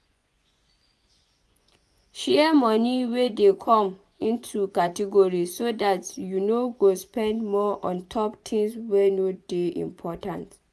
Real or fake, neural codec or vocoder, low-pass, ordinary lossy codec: fake; vocoder, 24 kHz, 100 mel bands, Vocos; none; none